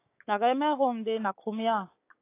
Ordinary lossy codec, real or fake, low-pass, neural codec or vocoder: AAC, 24 kbps; fake; 3.6 kHz; codec, 16 kHz, 6 kbps, DAC